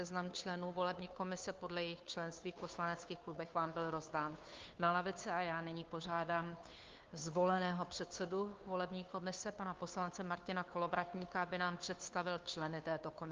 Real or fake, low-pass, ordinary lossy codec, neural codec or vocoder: fake; 7.2 kHz; Opus, 32 kbps; codec, 16 kHz, 2 kbps, FunCodec, trained on Chinese and English, 25 frames a second